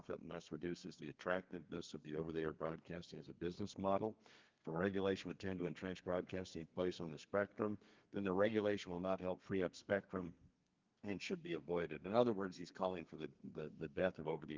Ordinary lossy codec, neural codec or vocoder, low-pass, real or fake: Opus, 32 kbps; codec, 32 kHz, 1.9 kbps, SNAC; 7.2 kHz; fake